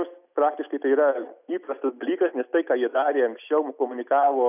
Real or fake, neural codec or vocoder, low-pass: real; none; 3.6 kHz